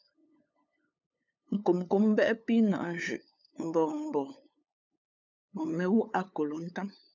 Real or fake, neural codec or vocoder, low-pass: fake; codec, 16 kHz, 8 kbps, FunCodec, trained on LibriTTS, 25 frames a second; 7.2 kHz